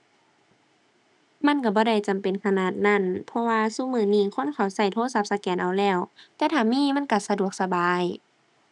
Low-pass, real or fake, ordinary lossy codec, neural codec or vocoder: 10.8 kHz; real; none; none